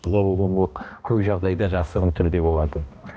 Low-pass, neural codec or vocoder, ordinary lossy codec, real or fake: none; codec, 16 kHz, 1 kbps, X-Codec, HuBERT features, trained on balanced general audio; none; fake